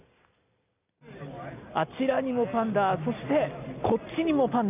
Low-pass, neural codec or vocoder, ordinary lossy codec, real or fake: 3.6 kHz; none; none; real